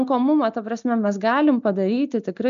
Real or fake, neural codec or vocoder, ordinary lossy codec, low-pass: real; none; MP3, 96 kbps; 7.2 kHz